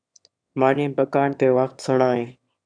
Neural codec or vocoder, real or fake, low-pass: autoencoder, 22.05 kHz, a latent of 192 numbers a frame, VITS, trained on one speaker; fake; 9.9 kHz